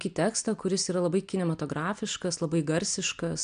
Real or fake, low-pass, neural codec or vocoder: real; 9.9 kHz; none